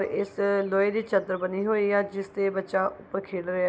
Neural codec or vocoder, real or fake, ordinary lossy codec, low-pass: none; real; none; none